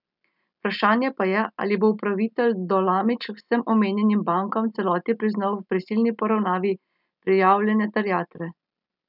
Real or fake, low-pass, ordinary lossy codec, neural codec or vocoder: real; 5.4 kHz; none; none